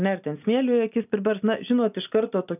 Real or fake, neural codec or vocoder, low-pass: real; none; 3.6 kHz